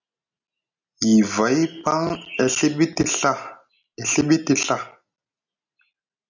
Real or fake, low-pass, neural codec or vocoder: real; 7.2 kHz; none